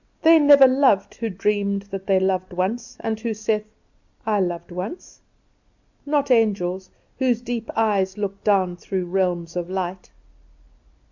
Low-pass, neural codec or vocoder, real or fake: 7.2 kHz; none; real